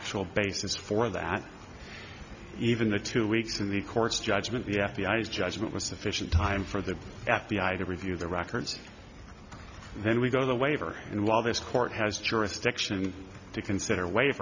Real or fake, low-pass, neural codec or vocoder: real; 7.2 kHz; none